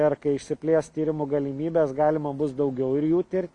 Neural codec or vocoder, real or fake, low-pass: none; real; 10.8 kHz